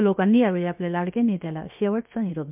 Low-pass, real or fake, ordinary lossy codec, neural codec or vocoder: 3.6 kHz; fake; MP3, 32 kbps; codec, 16 kHz, 0.7 kbps, FocalCodec